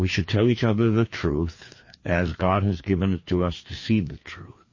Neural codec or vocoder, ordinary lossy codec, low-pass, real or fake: codec, 16 kHz, 2 kbps, FreqCodec, larger model; MP3, 32 kbps; 7.2 kHz; fake